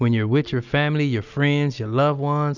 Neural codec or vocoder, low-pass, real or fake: none; 7.2 kHz; real